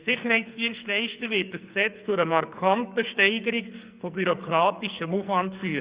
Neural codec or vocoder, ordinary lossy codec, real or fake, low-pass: codec, 44.1 kHz, 2.6 kbps, SNAC; Opus, 64 kbps; fake; 3.6 kHz